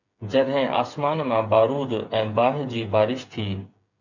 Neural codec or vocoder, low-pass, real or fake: codec, 16 kHz, 16 kbps, FreqCodec, smaller model; 7.2 kHz; fake